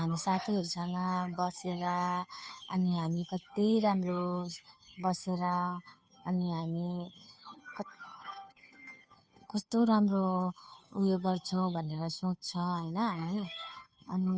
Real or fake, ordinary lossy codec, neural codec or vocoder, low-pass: fake; none; codec, 16 kHz, 2 kbps, FunCodec, trained on Chinese and English, 25 frames a second; none